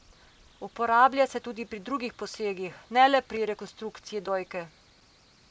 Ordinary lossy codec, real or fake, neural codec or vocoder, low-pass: none; real; none; none